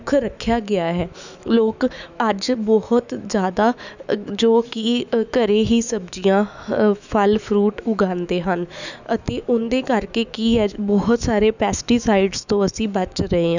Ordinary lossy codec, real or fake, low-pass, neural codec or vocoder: none; fake; 7.2 kHz; autoencoder, 48 kHz, 128 numbers a frame, DAC-VAE, trained on Japanese speech